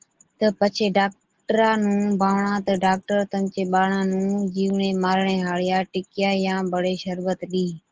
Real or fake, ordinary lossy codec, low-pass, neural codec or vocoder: real; Opus, 16 kbps; 7.2 kHz; none